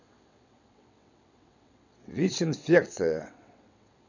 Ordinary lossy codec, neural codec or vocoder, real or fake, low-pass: none; vocoder, 22.05 kHz, 80 mel bands, WaveNeXt; fake; 7.2 kHz